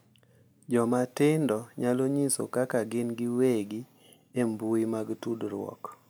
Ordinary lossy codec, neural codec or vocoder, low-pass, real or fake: none; none; none; real